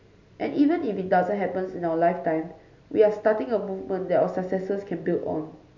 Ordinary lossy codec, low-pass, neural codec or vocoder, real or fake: MP3, 64 kbps; 7.2 kHz; none; real